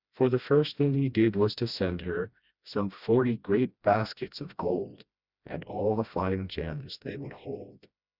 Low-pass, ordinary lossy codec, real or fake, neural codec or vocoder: 5.4 kHz; Opus, 64 kbps; fake; codec, 16 kHz, 1 kbps, FreqCodec, smaller model